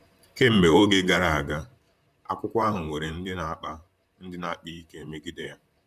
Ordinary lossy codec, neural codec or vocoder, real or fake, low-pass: none; vocoder, 44.1 kHz, 128 mel bands, Pupu-Vocoder; fake; 14.4 kHz